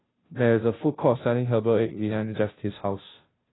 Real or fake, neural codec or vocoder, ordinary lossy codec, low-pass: fake; codec, 16 kHz, 0.5 kbps, FunCodec, trained on Chinese and English, 25 frames a second; AAC, 16 kbps; 7.2 kHz